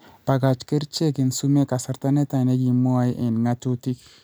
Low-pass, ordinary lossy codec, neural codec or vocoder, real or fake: none; none; none; real